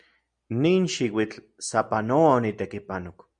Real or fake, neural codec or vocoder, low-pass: real; none; 9.9 kHz